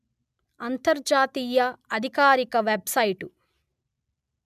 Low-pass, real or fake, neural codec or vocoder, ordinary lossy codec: 14.4 kHz; real; none; none